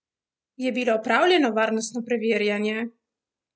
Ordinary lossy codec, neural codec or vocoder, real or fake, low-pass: none; none; real; none